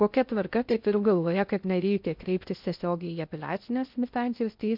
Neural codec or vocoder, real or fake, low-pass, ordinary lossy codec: codec, 16 kHz in and 24 kHz out, 0.6 kbps, FocalCodec, streaming, 2048 codes; fake; 5.4 kHz; MP3, 48 kbps